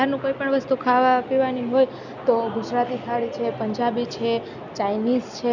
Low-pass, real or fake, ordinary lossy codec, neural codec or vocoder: 7.2 kHz; real; none; none